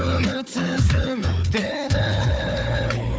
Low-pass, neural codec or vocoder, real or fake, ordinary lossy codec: none; codec, 16 kHz, 4 kbps, FunCodec, trained on Chinese and English, 50 frames a second; fake; none